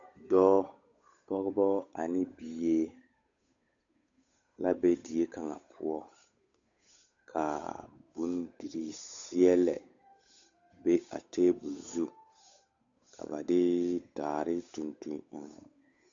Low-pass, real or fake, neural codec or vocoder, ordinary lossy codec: 7.2 kHz; fake; codec, 16 kHz, 8 kbps, FunCodec, trained on Chinese and English, 25 frames a second; MP3, 64 kbps